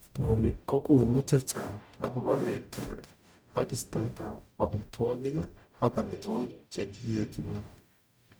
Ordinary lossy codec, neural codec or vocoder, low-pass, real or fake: none; codec, 44.1 kHz, 0.9 kbps, DAC; none; fake